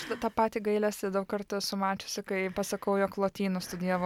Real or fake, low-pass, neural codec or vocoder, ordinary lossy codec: real; 19.8 kHz; none; MP3, 96 kbps